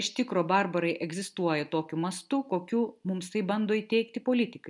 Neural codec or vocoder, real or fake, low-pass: none; real; 10.8 kHz